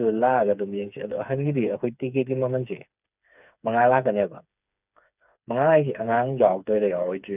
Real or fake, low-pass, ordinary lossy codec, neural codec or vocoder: fake; 3.6 kHz; none; codec, 16 kHz, 4 kbps, FreqCodec, smaller model